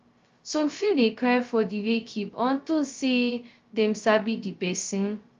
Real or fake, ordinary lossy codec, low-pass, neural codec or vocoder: fake; Opus, 32 kbps; 7.2 kHz; codec, 16 kHz, 0.2 kbps, FocalCodec